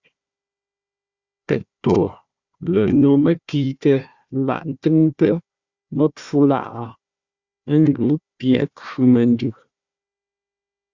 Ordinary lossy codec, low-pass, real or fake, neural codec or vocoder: Opus, 64 kbps; 7.2 kHz; fake; codec, 16 kHz, 1 kbps, FunCodec, trained on Chinese and English, 50 frames a second